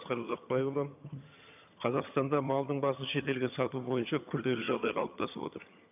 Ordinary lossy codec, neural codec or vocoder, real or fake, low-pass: AAC, 32 kbps; vocoder, 22.05 kHz, 80 mel bands, HiFi-GAN; fake; 3.6 kHz